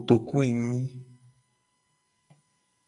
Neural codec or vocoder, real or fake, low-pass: codec, 44.1 kHz, 2.6 kbps, SNAC; fake; 10.8 kHz